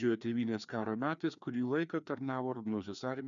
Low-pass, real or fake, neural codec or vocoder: 7.2 kHz; fake; codec, 16 kHz, 2 kbps, FreqCodec, larger model